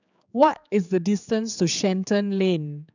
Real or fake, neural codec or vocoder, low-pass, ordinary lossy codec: fake; codec, 16 kHz, 4 kbps, X-Codec, HuBERT features, trained on general audio; 7.2 kHz; none